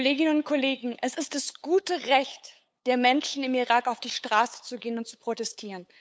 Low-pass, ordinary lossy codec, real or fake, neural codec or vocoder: none; none; fake; codec, 16 kHz, 8 kbps, FunCodec, trained on LibriTTS, 25 frames a second